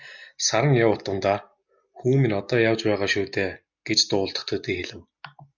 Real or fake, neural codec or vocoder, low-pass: real; none; 7.2 kHz